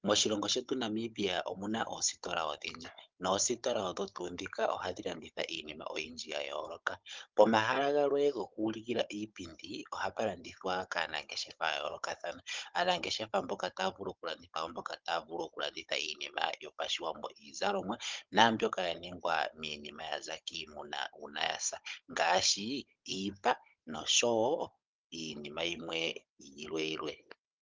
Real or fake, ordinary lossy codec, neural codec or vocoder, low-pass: fake; Opus, 32 kbps; codec, 16 kHz, 16 kbps, FunCodec, trained on Chinese and English, 50 frames a second; 7.2 kHz